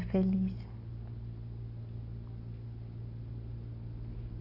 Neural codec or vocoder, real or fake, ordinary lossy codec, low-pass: none; real; none; 5.4 kHz